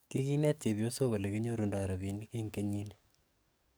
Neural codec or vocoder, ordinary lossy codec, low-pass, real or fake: codec, 44.1 kHz, 7.8 kbps, DAC; none; none; fake